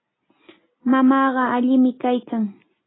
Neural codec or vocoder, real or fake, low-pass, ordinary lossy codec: none; real; 7.2 kHz; AAC, 16 kbps